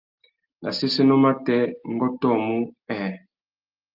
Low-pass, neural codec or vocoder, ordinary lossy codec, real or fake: 5.4 kHz; none; Opus, 32 kbps; real